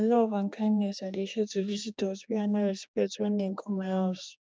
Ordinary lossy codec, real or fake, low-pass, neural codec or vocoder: none; fake; none; codec, 16 kHz, 2 kbps, X-Codec, HuBERT features, trained on general audio